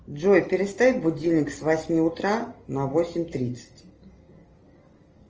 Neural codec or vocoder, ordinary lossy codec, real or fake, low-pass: none; Opus, 24 kbps; real; 7.2 kHz